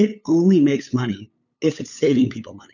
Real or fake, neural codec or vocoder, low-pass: fake; codec, 16 kHz, 8 kbps, FunCodec, trained on LibriTTS, 25 frames a second; 7.2 kHz